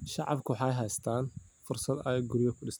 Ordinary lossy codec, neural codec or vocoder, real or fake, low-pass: none; none; real; none